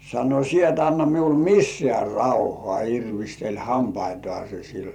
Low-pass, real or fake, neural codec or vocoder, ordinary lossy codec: 19.8 kHz; fake; vocoder, 44.1 kHz, 128 mel bands every 512 samples, BigVGAN v2; none